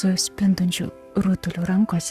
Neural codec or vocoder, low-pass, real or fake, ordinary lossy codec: codec, 44.1 kHz, 7.8 kbps, Pupu-Codec; 14.4 kHz; fake; Opus, 64 kbps